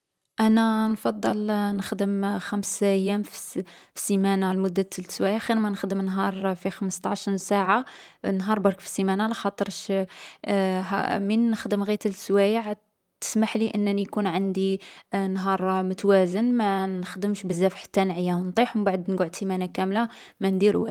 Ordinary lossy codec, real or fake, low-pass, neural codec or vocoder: Opus, 32 kbps; fake; 19.8 kHz; vocoder, 44.1 kHz, 128 mel bands, Pupu-Vocoder